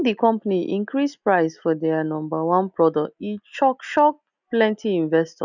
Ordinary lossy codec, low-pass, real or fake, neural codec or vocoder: none; 7.2 kHz; real; none